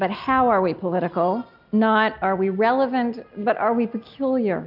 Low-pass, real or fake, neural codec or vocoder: 5.4 kHz; real; none